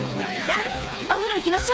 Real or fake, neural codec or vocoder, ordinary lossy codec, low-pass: fake; codec, 16 kHz, 4 kbps, FreqCodec, smaller model; none; none